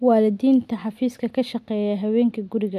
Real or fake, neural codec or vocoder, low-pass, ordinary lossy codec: real; none; 14.4 kHz; none